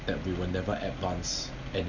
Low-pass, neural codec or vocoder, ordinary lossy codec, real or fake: 7.2 kHz; none; none; real